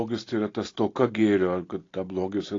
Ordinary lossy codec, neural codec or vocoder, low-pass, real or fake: AAC, 32 kbps; none; 7.2 kHz; real